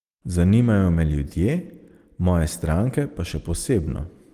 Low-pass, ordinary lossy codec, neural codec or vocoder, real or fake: 14.4 kHz; Opus, 24 kbps; none; real